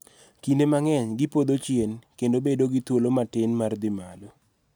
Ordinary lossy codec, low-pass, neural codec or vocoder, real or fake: none; none; none; real